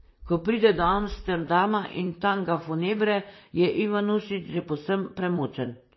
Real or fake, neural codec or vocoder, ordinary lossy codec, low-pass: fake; vocoder, 44.1 kHz, 128 mel bands, Pupu-Vocoder; MP3, 24 kbps; 7.2 kHz